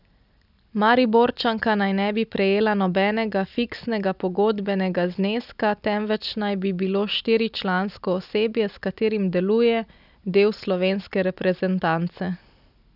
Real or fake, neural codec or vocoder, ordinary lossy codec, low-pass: real; none; none; 5.4 kHz